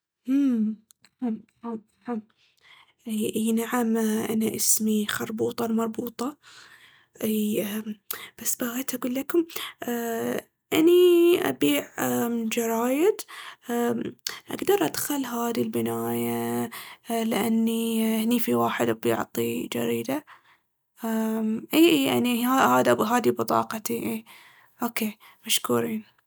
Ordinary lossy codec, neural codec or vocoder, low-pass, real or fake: none; none; none; real